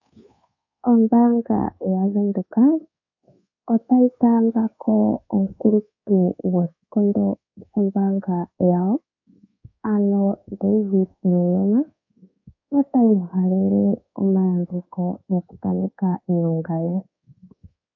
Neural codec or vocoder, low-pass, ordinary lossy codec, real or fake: codec, 24 kHz, 1.2 kbps, DualCodec; 7.2 kHz; AAC, 48 kbps; fake